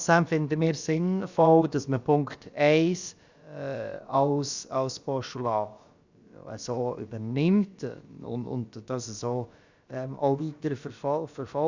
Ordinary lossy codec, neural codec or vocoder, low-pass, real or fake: Opus, 64 kbps; codec, 16 kHz, about 1 kbps, DyCAST, with the encoder's durations; 7.2 kHz; fake